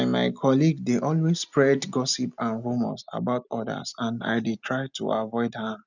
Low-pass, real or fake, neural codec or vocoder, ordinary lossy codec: 7.2 kHz; real; none; none